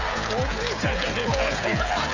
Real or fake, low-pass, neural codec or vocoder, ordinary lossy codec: fake; 7.2 kHz; codec, 16 kHz, 16 kbps, FreqCodec, smaller model; AAC, 48 kbps